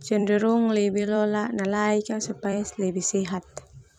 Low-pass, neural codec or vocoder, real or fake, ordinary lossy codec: 19.8 kHz; vocoder, 44.1 kHz, 128 mel bands every 256 samples, BigVGAN v2; fake; none